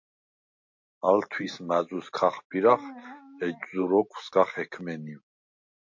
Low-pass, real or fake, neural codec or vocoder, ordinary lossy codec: 7.2 kHz; real; none; MP3, 48 kbps